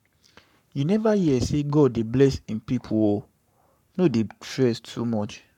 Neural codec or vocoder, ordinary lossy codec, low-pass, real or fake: codec, 44.1 kHz, 7.8 kbps, Pupu-Codec; none; 19.8 kHz; fake